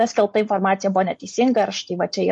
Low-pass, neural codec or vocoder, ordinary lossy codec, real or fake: 10.8 kHz; none; MP3, 48 kbps; real